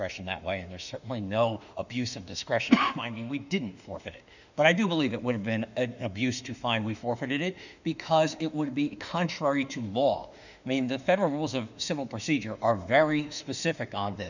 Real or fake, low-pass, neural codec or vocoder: fake; 7.2 kHz; autoencoder, 48 kHz, 32 numbers a frame, DAC-VAE, trained on Japanese speech